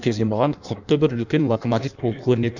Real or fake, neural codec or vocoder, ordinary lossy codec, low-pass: fake; codec, 16 kHz in and 24 kHz out, 1.1 kbps, FireRedTTS-2 codec; none; 7.2 kHz